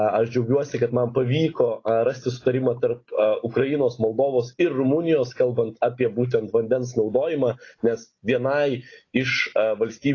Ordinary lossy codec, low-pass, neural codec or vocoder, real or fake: AAC, 32 kbps; 7.2 kHz; none; real